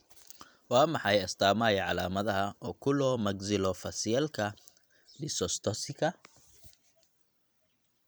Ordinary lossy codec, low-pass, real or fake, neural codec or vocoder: none; none; fake; vocoder, 44.1 kHz, 128 mel bands every 256 samples, BigVGAN v2